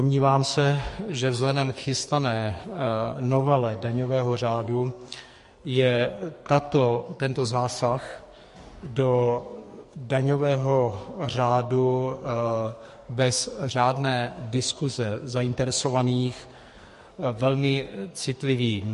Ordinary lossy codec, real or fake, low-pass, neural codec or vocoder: MP3, 48 kbps; fake; 14.4 kHz; codec, 44.1 kHz, 2.6 kbps, SNAC